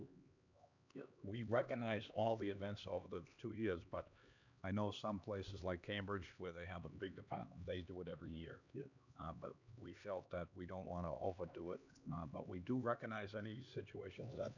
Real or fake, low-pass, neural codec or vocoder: fake; 7.2 kHz; codec, 16 kHz, 2 kbps, X-Codec, HuBERT features, trained on LibriSpeech